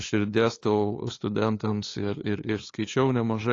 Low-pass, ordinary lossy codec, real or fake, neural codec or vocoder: 7.2 kHz; AAC, 32 kbps; fake; codec, 16 kHz, 2 kbps, FunCodec, trained on LibriTTS, 25 frames a second